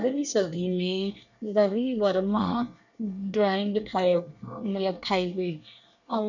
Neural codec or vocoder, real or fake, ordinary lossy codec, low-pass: codec, 24 kHz, 1 kbps, SNAC; fake; none; 7.2 kHz